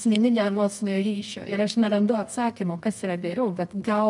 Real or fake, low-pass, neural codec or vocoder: fake; 10.8 kHz; codec, 24 kHz, 0.9 kbps, WavTokenizer, medium music audio release